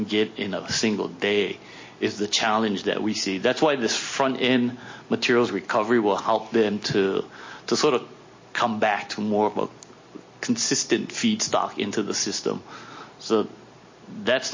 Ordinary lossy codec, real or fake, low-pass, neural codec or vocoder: MP3, 32 kbps; real; 7.2 kHz; none